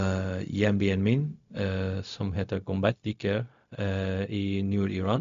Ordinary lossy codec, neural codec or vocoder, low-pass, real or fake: MP3, 64 kbps; codec, 16 kHz, 0.4 kbps, LongCat-Audio-Codec; 7.2 kHz; fake